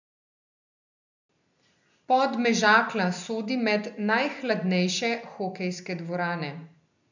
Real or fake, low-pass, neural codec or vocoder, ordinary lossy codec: real; 7.2 kHz; none; none